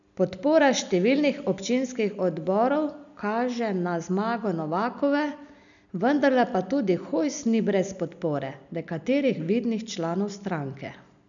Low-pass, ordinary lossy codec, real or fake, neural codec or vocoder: 7.2 kHz; none; real; none